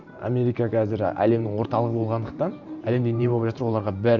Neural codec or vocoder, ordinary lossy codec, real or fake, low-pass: vocoder, 44.1 kHz, 128 mel bands every 512 samples, BigVGAN v2; none; fake; 7.2 kHz